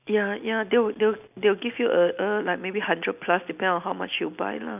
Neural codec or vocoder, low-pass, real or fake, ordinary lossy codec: none; 3.6 kHz; real; none